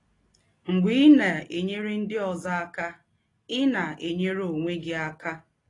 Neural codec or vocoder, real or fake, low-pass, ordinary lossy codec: none; real; 10.8 kHz; AAC, 32 kbps